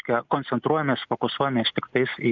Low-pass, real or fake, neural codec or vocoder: 7.2 kHz; real; none